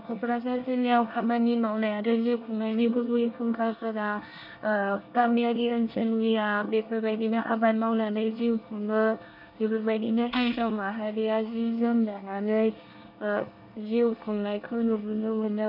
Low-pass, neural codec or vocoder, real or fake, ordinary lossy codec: 5.4 kHz; codec, 24 kHz, 1 kbps, SNAC; fake; none